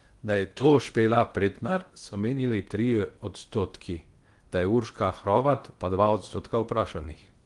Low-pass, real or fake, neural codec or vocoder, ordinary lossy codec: 10.8 kHz; fake; codec, 16 kHz in and 24 kHz out, 0.8 kbps, FocalCodec, streaming, 65536 codes; Opus, 24 kbps